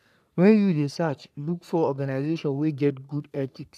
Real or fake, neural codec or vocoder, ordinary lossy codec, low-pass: fake; codec, 44.1 kHz, 3.4 kbps, Pupu-Codec; none; 14.4 kHz